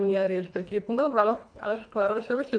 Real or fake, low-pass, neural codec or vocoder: fake; 9.9 kHz; codec, 24 kHz, 1.5 kbps, HILCodec